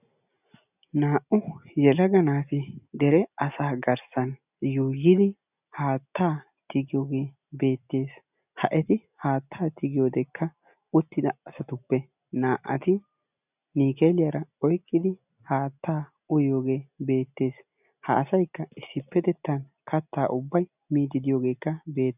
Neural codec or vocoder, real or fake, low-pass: none; real; 3.6 kHz